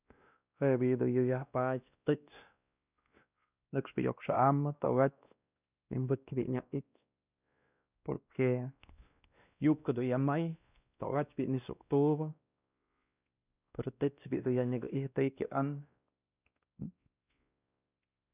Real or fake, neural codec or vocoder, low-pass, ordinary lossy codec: fake; codec, 16 kHz, 1 kbps, X-Codec, WavLM features, trained on Multilingual LibriSpeech; 3.6 kHz; none